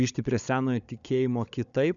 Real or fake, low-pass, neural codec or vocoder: fake; 7.2 kHz; codec, 16 kHz, 16 kbps, FunCodec, trained on Chinese and English, 50 frames a second